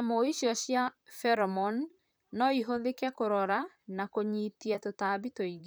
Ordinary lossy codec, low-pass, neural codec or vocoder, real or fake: none; none; vocoder, 44.1 kHz, 128 mel bands, Pupu-Vocoder; fake